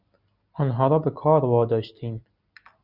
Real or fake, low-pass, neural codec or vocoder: real; 5.4 kHz; none